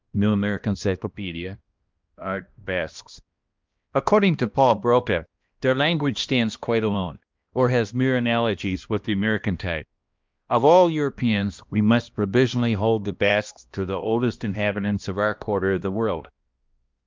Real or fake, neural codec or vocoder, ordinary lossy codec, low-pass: fake; codec, 16 kHz, 1 kbps, X-Codec, HuBERT features, trained on balanced general audio; Opus, 24 kbps; 7.2 kHz